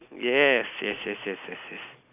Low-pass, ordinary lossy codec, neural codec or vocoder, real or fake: 3.6 kHz; none; none; real